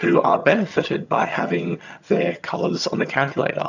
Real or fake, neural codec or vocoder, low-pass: fake; vocoder, 22.05 kHz, 80 mel bands, HiFi-GAN; 7.2 kHz